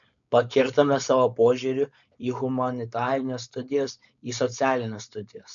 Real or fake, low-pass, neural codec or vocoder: fake; 7.2 kHz; codec, 16 kHz, 4.8 kbps, FACodec